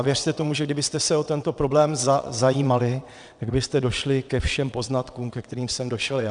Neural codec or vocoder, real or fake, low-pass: vocoder, 22.05 kHz, 80 mel bands, WaveNeXt; fake; 9.9 kHz